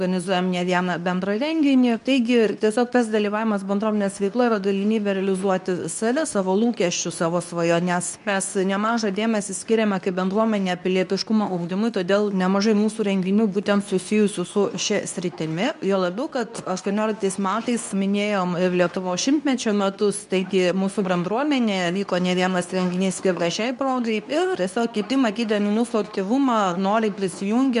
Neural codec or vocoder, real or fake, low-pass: codec, 24 kHz, 0.9 kbps, WavTokenizer, medium speech release version 2; fake; 10.8 kHz